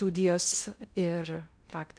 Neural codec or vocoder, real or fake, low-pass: codec, 16 kHz in and 24 kHz out, 0.6 kbps, FocalCodec, streaming, 2048 codes; fake; 9.9 kHz